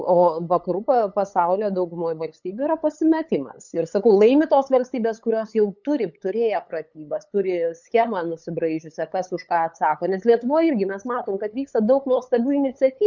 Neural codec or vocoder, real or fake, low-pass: codec, 16 kHz, 8 kbps, FunCodec, trained on LibriTTS, 25 frames a second; fake; 7.2 kHz